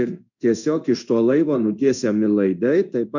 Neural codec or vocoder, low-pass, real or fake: codec, 16 kHz in and 24 kHz out, 1 kbps, XY-Tokenizer; 7.2 kHz; fake